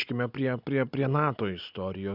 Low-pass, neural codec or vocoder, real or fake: 5.4 kHz; none; real